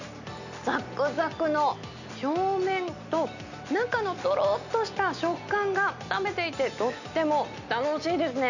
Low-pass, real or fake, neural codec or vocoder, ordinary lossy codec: 7.2 kHz; real; none; none